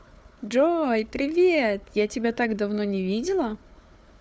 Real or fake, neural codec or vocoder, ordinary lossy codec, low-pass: fake; codec, 16 kHz, 4 kbps, FunCodec, trained on Chinese and English, 50 frames a second; none; none